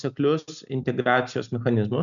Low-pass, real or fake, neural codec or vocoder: 7.2 kHz; real; none